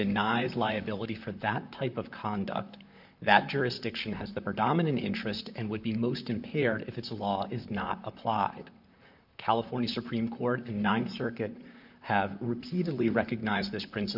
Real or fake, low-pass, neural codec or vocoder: fake; 5.4 kHz; vocoder, 44.1 kHz, 128 mel bands, Pupu-Vocoder